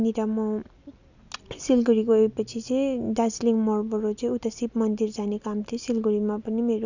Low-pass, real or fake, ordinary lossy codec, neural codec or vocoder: 7.2 kHz; real; none; none